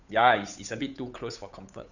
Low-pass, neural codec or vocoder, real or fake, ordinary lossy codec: 7.2 kHz; codec, 16 kHz, 8 kbps, FunCodec, trained on Chinese and English, 25 frames a second; fake; none